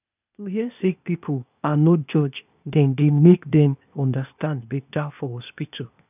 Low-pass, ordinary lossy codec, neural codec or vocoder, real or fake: 3.6 kHz; none; codec, 16 kHz, 0.8 kbps, ZipCodec; fake